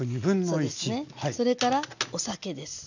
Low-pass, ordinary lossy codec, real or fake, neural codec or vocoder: 7.2 kHz; none; real; none